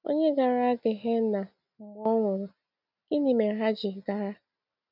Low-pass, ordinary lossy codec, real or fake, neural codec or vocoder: 5.4 kHz; none; real; none